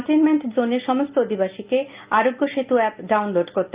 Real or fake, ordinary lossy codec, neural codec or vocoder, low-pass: real; Opus, 32 kbps; none; 3.6 kHz